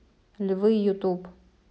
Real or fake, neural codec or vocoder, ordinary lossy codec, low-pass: real; none; none; none